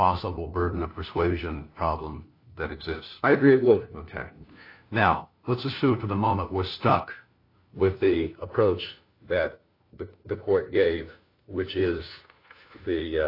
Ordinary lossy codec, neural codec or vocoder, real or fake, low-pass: AAC, 32 kbps; codec, 16 kHz, 1 kbps, FunCodec, trained on LibriTTS, 50 frames a second; fake; 5.4 kHz